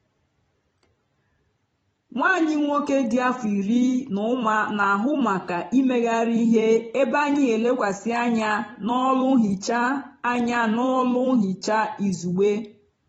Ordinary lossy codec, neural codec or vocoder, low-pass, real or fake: AAC, 24 kbps; vocoder, 44.1 kHz, 128 mel bands every 256 samples, BigVGAN v2; 19.8 kHz; fake